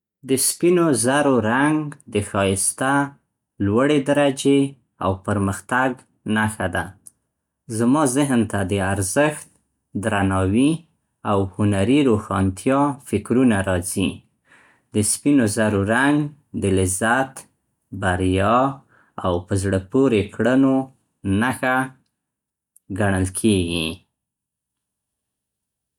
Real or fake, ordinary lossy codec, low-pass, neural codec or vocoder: real; none; 19.8 kHz; none